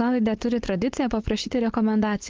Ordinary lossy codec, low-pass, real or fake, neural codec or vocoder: Opus, 16 kbps; 7.2 kHz; fake; codec, 16 kHz, 4.8 kbps, FACodec